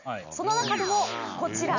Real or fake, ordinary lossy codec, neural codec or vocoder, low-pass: real; none; none; 7.2 kHz